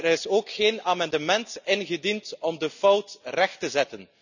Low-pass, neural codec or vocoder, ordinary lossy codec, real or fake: 7.2 kHz; none; none; real